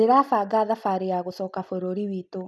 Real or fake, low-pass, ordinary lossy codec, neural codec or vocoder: real; none; none; none